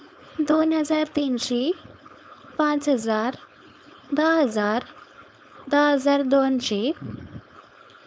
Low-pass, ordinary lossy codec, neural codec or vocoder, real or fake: none; none; codec, 16 kHz, 4.8 kbps, FACodec; fake